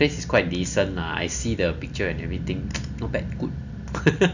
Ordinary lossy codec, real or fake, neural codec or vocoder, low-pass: none; real; none; 7.2 kHz